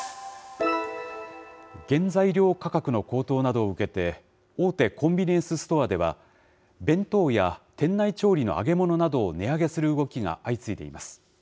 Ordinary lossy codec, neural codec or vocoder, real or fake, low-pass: none; none; real; none